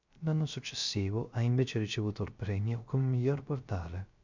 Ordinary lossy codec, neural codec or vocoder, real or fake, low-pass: MP3, 64 kbps; codec, 16 kHz, 0.3 kbps, FocalCodec; fake; 7.2 kHz